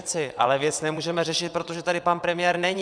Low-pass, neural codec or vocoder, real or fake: 9.9 kHz; vocoder, 22.05 kHz, 80 mel bands, WaveNeXt; fake